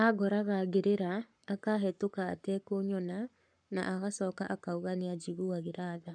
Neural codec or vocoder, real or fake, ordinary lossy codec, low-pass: codec, 44.1 kHz, 7.8 kbps, Pupu-Codec; fake; none; 9.9 kHz